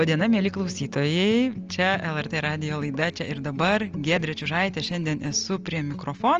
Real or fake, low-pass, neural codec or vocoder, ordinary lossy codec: real; 7.2 kHz; none; Opus, 24 kbps